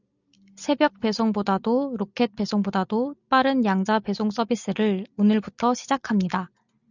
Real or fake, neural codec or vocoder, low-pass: real; none; 7.2 kHz